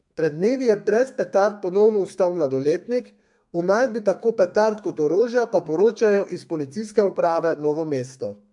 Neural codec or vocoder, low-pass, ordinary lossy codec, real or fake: codec, 32 kHz, 1.9 kbps, SNAC; 10.8 kHz; MP3, 64 kbps; fake